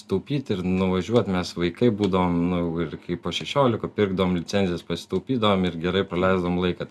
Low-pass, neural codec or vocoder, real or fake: 14.4 kHz; none; real